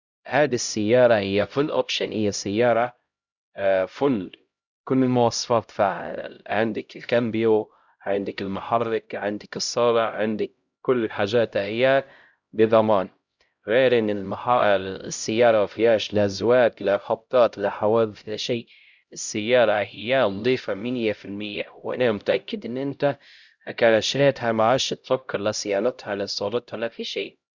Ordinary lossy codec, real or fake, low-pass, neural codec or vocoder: Opus, 64 kbps; fake; 7.2 kHz; codec, 16 kHz, 0.5 kbps, X-Codec, HuBERT features, trained on LibriSpeech